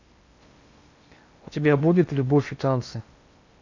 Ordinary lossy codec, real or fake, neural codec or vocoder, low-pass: AAC, 48 kbps; fake; codec, 16 kHz in and 24 kHz out, 0.8 kbps, FocalCodec, streaming, 65536 codes; 7.2 kHz